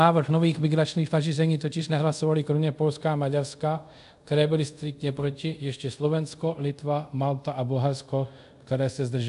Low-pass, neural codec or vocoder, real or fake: 10.8 kHz; codec, 24 kHz, 0.5 kbps, DualCodec; fake